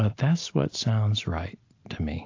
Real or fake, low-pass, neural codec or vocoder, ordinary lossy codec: real; 7.2 kHz; none; AAC, 48 kbps